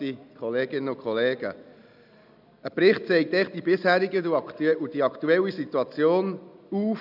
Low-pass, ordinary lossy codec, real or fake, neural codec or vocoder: 5.4 kHz; none; real; none